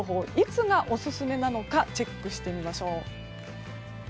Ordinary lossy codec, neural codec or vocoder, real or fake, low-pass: none; none; real; none